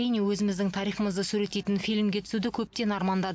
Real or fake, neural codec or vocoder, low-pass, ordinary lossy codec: real; none; none; none